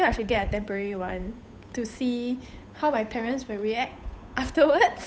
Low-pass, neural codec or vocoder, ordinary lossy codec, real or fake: none; codec, 16 kHz, 8 kbps, FunCodec, trained on Chinese and English, 25 frames a second; none; fake